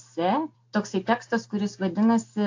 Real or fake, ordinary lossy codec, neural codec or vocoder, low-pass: real; AAC, 48 kbps; none; 7.2 kHz